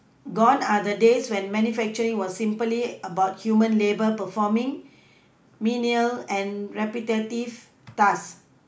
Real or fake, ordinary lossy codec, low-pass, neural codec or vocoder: real; none; none; none